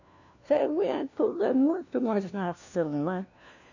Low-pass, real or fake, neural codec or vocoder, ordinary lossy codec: 7.2 kHz; fake; codec, 16 kHz, 1 kbps, FunCodec, trained on LibriTTS, 50 frames a second; none